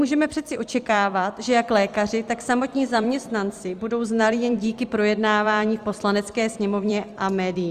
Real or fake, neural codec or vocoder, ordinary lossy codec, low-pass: fake; vocoder, 44.1 kHz, 128 mel bands every 256 samples, BigVGAN v2; Opus, 32 kbps; 14.4 kHz